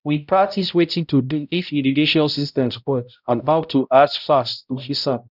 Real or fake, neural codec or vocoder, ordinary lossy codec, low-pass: fake; codec, 16 kHz, 0.5 kbps, X-Codec, HuBERT features, trained on balanced general audio; none; 5.4 kHz